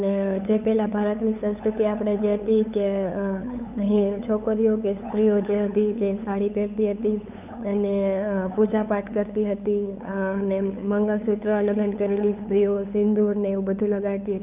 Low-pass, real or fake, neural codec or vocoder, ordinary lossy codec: 3.6 kHz; fake; codec, 16 kHz, 8 kbps, FunCodec, trained on LibriTTS, 25 frames a second; none